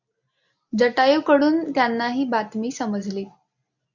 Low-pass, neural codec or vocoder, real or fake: 7.2 kHz; none; real